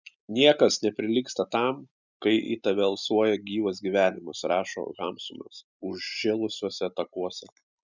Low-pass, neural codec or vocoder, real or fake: 7.2 kHz; none; real